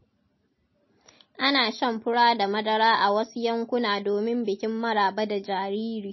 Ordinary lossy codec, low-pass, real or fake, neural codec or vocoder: MP3, 24 kbps; 7.2 kHz; real; none